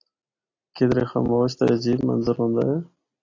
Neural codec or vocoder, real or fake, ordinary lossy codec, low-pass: none; real; AAC, 32 kbps; 7.2 kHz